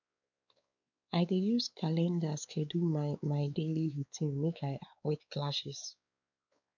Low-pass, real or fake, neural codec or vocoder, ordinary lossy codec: 7.2 kHz; fake; codec, 16 kHz, 4 kbps, X-Codec, WavLM features, trained on Multilingual LibriSpeech; none